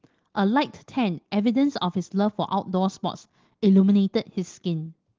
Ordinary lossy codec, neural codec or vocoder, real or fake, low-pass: Opus, 16 kbps; none; real; 7.2 kHz